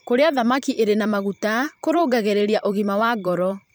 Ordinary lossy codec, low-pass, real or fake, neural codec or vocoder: none; none; fake; vocoder, 44.1 kHz, 128 mel bands every 512 samples, BigVGAN v2